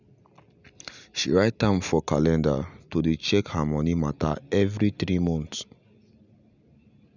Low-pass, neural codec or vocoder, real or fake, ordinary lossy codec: 7.2 kHz; none; real; none